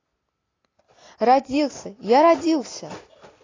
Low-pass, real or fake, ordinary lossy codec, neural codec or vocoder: 7.2 kHz; real; AAC, 32 kbps; none